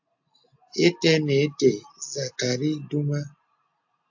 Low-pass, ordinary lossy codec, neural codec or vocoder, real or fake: 7.2 kHz; AAC, 48 kbps; none; real